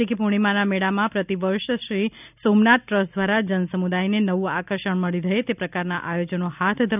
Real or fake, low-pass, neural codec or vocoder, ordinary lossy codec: real; 3.6 kHz; none; none